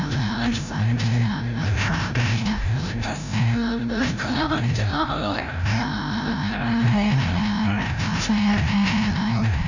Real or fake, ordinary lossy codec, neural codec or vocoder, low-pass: fake; none; codec, 16 kHz, 0.5 kbps, FreqCodec, larger model; 7.2 kHz